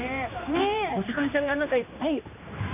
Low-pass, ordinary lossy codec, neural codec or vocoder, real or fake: 3.6 kHz; none; codec, 16 kHz, 2 kbps, X-Codec, HuBERT features, trained on general audio; fake